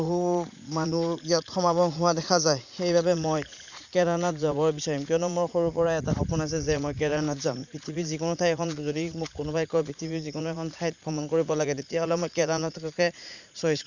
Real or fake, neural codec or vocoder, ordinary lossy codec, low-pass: fake; vocoder, 44.1 kHz, 80 mel bands, Vocos; none; 7.2 kHz